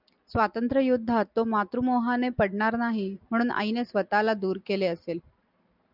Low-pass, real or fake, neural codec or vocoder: 5.4 kHz; real; none